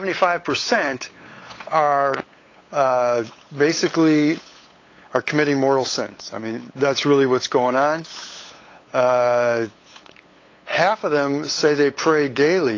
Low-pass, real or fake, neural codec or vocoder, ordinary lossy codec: 7.2 kHz; fake; codec, 16 kHz, 8 kbps, FunCodec, trained on LibriTTS, 25 frames a second; AAC, 32 kbps